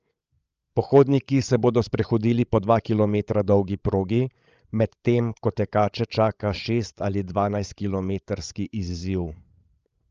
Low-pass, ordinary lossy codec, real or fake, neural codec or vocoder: 7.2 kHz; Opus, 24 kbps; fake; codec, 16 kHz, 16 kbps, FreqCodec, larger model